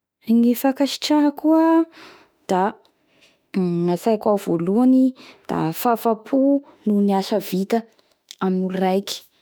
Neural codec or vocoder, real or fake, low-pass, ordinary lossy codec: autoencoder, 48 kHz, 32 numbers a frame, DAC-VAE, trained on Japanese speech; fake; none; none